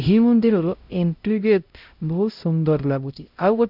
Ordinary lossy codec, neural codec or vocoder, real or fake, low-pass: none; codec, 16 kHz, 0.5 kbps, X-Codec, HuBERT features, trained on LibriSpeech; fake; 5.4 kHz